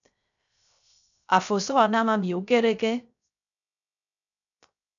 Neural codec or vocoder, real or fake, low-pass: codec, 16 kHz, 0.3 kbps, FocalCodec; fake; 7.2 kHz